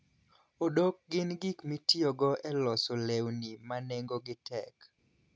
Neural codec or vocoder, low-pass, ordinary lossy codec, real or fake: none; none; none; real